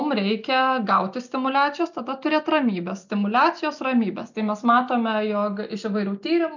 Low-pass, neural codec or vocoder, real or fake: 7.2 kHz; none; real